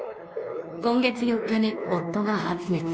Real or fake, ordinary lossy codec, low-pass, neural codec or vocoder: fake; none; none; codec, 16 kHz, 2 kbps, X-Codec, WavLM features, trained on Multilingual LibriSpeech